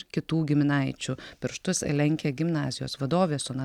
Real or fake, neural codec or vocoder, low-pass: real; none; 19.8 kHz